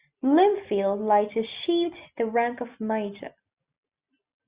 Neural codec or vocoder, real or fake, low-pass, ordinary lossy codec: none; real; 3.6 kHz; Opus, 64 kbps